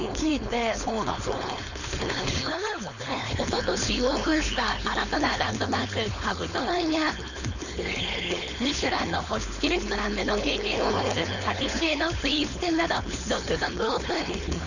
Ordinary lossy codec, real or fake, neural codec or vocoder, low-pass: none; fake; codec, 16 kHz, 4.8 kbps, FACodec; 7.2 kHz